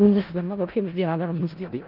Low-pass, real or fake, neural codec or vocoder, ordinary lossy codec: 5.4 kHz; fake; codec, 16 kHz in and 24 kHz out, 0.4 kbps, LongCat-Audio-Codec, four codebook decoder; Opus, 32 kbps